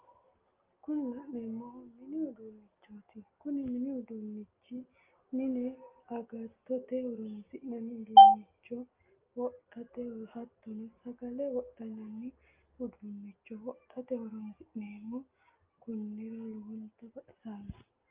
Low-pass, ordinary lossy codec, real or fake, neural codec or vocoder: 3.6 kHz; Opus, 24 kbps; real; none